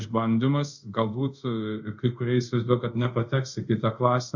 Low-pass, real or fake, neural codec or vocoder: 7.2 kHz; fake; codec, 24 kHz, 0.5 kbps, DualCodec